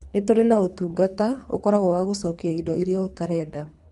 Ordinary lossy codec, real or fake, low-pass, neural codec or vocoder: none; fake; 10.8 kHz; codec, 24 kHz, 3 kbps, HILCodec